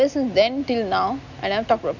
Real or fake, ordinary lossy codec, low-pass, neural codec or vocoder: real; none; 7.2 kHz; none